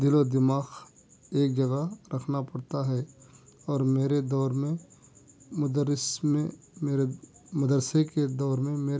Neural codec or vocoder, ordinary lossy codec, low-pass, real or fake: none; none; none; real